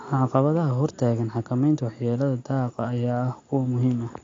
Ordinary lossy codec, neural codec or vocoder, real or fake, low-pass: AAC, 32 kbps; none; real; 7.2 kHz